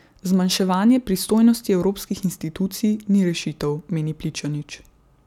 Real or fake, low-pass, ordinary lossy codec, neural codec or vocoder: real; 19.8 kHz; none; none